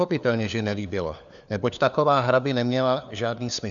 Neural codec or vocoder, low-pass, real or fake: codec, 16 kHz, 4 kbps, FunCodec, trained on LibriTTS, 50 frames a second; 7.2 kHz; fake